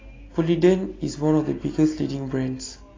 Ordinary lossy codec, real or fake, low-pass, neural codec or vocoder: AAC, 32 kbps; real; 7.2 kHz; none